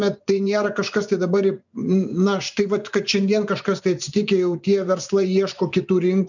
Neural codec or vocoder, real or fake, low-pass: vocoder, 24 kHz, 100 mel bands, Vocos; fake; 7.2 kHz